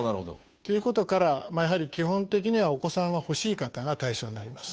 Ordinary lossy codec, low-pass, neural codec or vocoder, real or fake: none; none; codec, 16 kHz, 2 kbps, FunCodec, trained on Chinese and English, 25 frames a second; fake